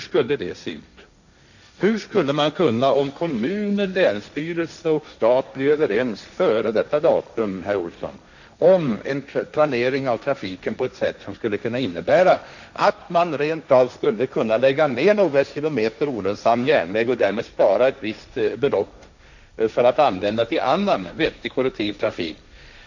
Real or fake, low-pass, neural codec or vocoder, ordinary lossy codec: fake; 7.2 kHz; codec, 16 kHz, 1.1 kbps, Voila-Tokenizer; none